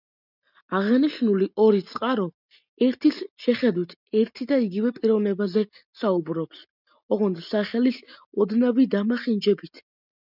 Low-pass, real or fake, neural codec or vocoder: 5.4 kHz; real; none